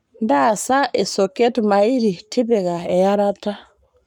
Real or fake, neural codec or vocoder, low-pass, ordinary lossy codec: fake; codec, 44.1 kHz, 7.8 kbps, Pupu-Codec; 19.8 kHz; none